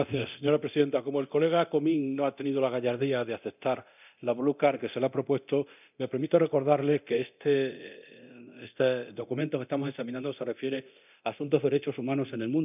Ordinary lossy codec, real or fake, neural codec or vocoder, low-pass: none; fake; codec, 24 kHz, 0.9 kbps, DualCodec; 3.6 kHz